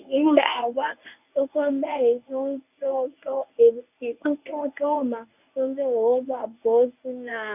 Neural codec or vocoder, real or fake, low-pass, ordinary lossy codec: codec, 24 kHz, 0.9 kbps, WavTokenizer, medium speech release version 1; fake; 3.6 kHz; none